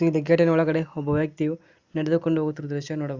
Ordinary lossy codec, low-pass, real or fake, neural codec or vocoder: Opus, 64 kbps; 7.2 kHz; real; none